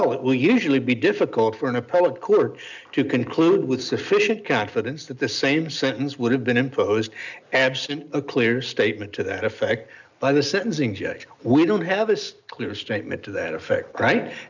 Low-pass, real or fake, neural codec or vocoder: 7.2 kHz; real; none